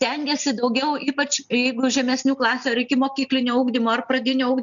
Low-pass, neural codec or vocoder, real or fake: 7.2 kHz; none; real